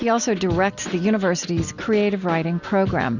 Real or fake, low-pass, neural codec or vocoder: real; 7.2 kHz; none